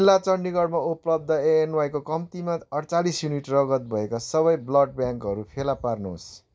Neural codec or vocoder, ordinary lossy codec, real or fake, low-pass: none; none; real; none